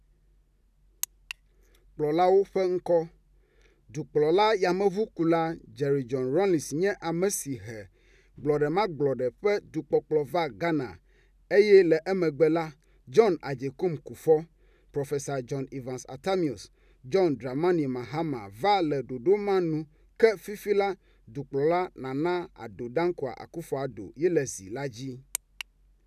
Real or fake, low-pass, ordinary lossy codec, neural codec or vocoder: real; 14.4 kHz; none; none